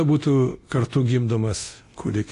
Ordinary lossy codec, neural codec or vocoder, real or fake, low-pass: AAC, 48 kbps; codec, 24 kHz, 0.9 kbps, DualCodec; fake; 10.8 kHz